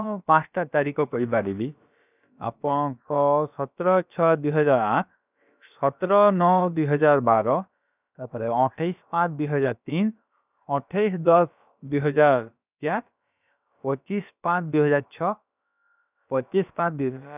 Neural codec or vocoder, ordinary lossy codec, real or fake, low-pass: codec, 16 kHz, about 1 kbps, DyCAST, with the encoder's durations; none; fake; 3.6 kHz